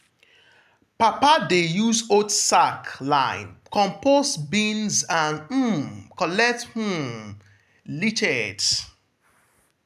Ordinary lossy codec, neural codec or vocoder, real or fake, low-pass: none; none; real; 14.4 kHz